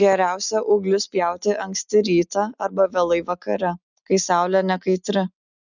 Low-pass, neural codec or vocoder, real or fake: 7.2 kHz; none; real